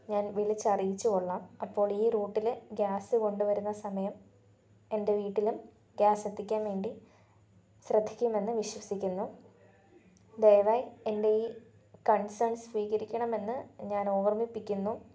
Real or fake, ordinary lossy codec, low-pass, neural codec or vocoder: real; none; none; none